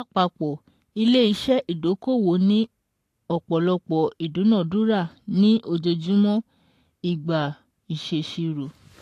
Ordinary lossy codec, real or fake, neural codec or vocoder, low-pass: AAC, 64 kbps; fake; codec, 44.1 kHz, 7.8 kbps, Pupu-Codec; 14.4 kHz